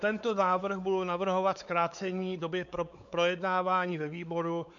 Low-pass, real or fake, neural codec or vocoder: 7.2 kHz; fake; codec, 16 kHz, 4 kbps, X-Codec, WavLM features, trained on Multilingual LibriSpeech